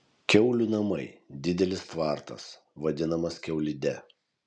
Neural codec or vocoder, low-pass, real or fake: none; 9.9 kHz; real